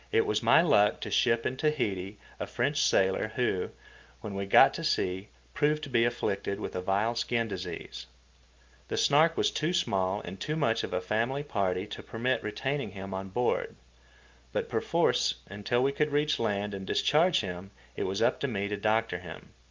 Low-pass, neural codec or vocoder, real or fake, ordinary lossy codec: 7.2 kHz; none; real; Opus, 24 kbps